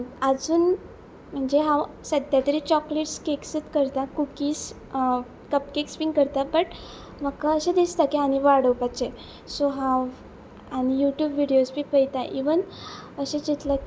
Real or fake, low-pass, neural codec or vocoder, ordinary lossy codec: real; none; none; none